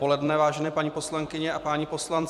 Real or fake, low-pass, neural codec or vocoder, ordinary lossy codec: fake; 14.4 kHz; vocoder, 44.1 kHz, 128 mel bands every 256 samples, BigVGAN v2; MP3, 96 kbps